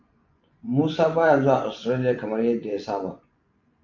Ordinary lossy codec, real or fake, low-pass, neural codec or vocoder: MP3, 64 kbps; fake; 7.2 kHz; vocoder, 24 kHz, 100 mel bands, Vocos